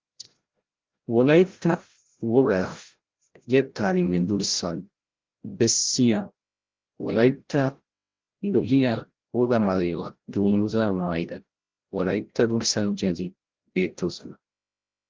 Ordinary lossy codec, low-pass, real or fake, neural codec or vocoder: Opus, 16 kbps; 7.2 kHz; fake; codec, 16 kHz, 0.5 kbps, FreqCodec, larger model